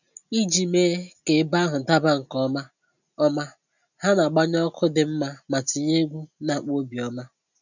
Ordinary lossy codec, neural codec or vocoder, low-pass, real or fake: none; none; 7.2 kHz; real